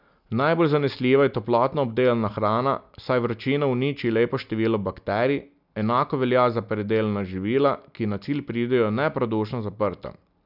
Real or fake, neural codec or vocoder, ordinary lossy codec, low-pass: real; none; none; 5.4 kHz